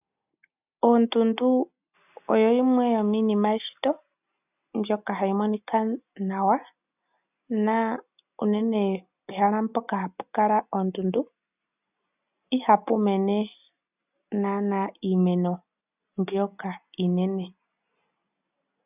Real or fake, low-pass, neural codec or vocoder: real; 3.6 kHz; none